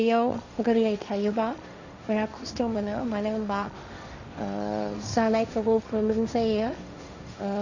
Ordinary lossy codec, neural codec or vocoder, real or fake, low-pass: none; codec, 16 kHz, 1.1 kbps, Voila-Tokenizer; fake; 7.2 kHz